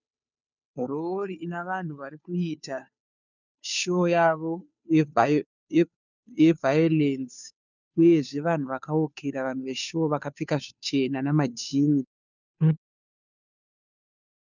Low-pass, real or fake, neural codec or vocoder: 7.2 kHz; fake; codec, 16 kHz, 2 kbps, FunCodec, trained on Chinese and English, 25 frames a second